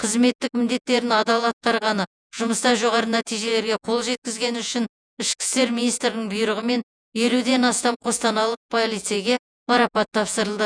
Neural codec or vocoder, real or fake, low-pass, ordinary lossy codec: vocoder, 48 kHz, 128 mel bands, Vocos; fake; 9.9 kHz; none